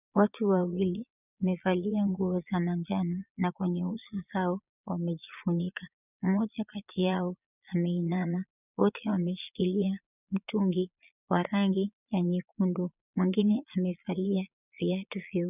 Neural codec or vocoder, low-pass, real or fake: vocoder, 22.05 kHz, 80 mel bands, Vocos; 3.6 kHz; fake